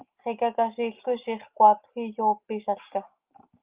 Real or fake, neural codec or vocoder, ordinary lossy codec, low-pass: real; none; Opus, 32 kbps; 3.6 kHz